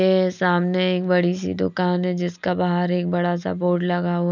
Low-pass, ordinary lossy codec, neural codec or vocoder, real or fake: 7.2 kHz; none; none; real